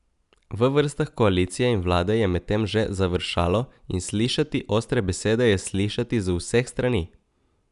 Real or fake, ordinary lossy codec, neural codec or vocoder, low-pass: real; none; none; 10.8 kHz